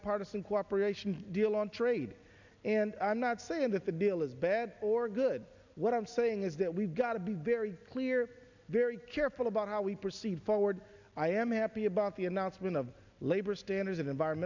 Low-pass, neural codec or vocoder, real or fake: 7.2 kHz; none; real